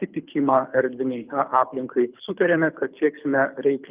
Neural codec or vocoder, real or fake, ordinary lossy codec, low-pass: codec, 24 kHz, 6 kbps, HILCodec; fake; Opus, 24 kbps; 3.6 kHz